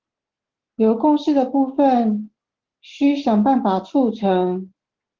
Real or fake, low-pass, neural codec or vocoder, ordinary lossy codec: real; 7.2 kHz; none; Opus, 16 kbps